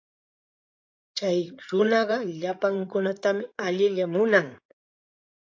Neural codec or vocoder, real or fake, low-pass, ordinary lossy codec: codec, 16 kHz in and 24 kHz out, 2.2 kbps, FireRedTTS-2 codec; fake; 7.2 kHz; AAC, 48 kbps